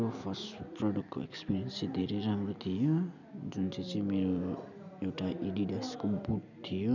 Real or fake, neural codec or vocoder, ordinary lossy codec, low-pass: real; none; none; 7.2 kHz